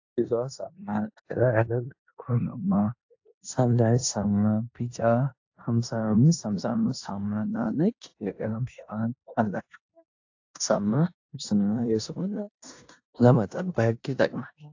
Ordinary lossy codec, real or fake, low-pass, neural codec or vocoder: AAC, 48 kbps; fake; 7.2 kHz; codec, 16 kHz in and 24 kHz out, 0.9 kbps, LongCat-Audio-Codec, four codebook decoder